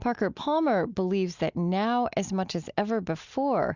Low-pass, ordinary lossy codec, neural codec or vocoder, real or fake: 7.2 kHz; Opus, 64 kbps; none; real